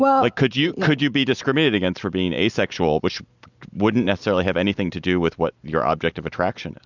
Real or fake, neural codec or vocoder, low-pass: real; none; 7.2 kHz